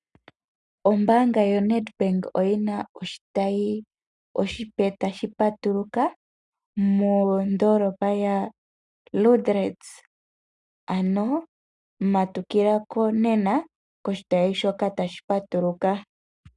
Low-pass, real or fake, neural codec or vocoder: 10.8 kHz; real; none